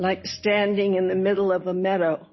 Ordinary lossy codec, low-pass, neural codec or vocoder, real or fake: MP3, 24 kbps; 7.2 kHz; none; real